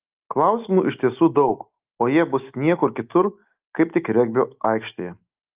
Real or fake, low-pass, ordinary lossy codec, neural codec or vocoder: real; 3.6 kHz; Opus, 24 kbps; none